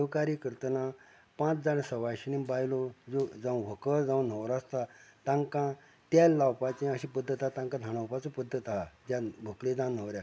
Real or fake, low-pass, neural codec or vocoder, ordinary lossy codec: real; none; none; none